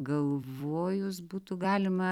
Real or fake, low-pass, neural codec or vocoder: fake; 19.8 kHz; vocoder, 44.1 kHz, 128 mel bands every 256 samples, BigVGAN v2